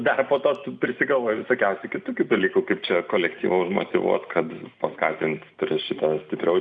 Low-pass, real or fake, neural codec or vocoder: 9.9 kHz; real; none